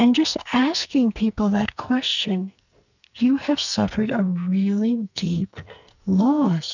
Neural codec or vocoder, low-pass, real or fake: codec, 44.1 kHz, 2.6 kbps, SNAC; 7.2 kHz; fake